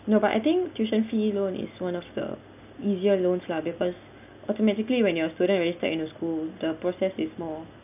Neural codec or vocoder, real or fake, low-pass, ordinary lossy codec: none; real; 3.6 kHz; none